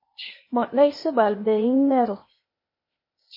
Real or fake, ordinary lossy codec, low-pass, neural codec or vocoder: fake; MP3, 24 kbps; 5.4 kHz; codec, 16 kHz, 0.8 kbps, ZipCodec